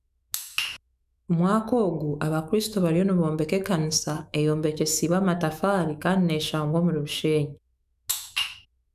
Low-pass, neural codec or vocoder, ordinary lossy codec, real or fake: 14.4 kHz; autoencoder, 48 kHz, 128 numbers a frame, DAC-VAE, trained on Japanese speech; none; fake